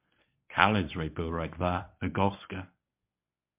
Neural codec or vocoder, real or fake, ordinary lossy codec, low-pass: codec, 24 kHz, 0.9 kbps, WavTokenizer, medium speech release version 1; fake; MP3, 32 kbps; 3.6 kHz